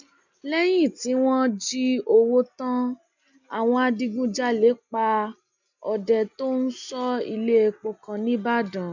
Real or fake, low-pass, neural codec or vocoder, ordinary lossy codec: real; 7.2 kHz; none; none